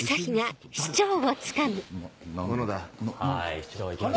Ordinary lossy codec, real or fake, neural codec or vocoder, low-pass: none; real; none; none